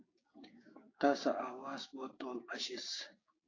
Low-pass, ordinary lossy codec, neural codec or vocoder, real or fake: 7.2 kHz; AAC, 32 kbps; codec, 16 kHz, 6 kbps, DAC; fake